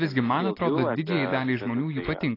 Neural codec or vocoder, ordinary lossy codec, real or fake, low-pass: none; AAC, 24 kbps; real; 5.4 kHz